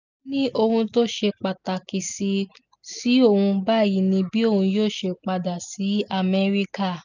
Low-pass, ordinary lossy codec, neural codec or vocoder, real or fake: 7.2 kHz; none; none; real